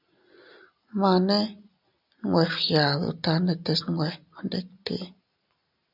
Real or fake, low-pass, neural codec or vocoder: real; 5.4 kHz; none